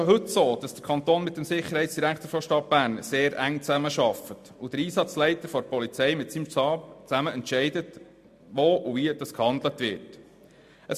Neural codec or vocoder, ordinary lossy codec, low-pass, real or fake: none; MP3, 64 kbps; 14.4 kHz; real